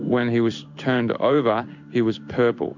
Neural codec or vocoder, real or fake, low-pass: codec, 16 kHz in and 24 kHz out, 1 kbps, XY-Tokenizer; fake; 7.2 kHz